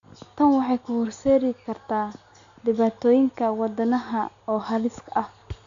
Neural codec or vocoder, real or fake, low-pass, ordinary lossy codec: none; real; 7.2 kHz; AAC, 64 kbps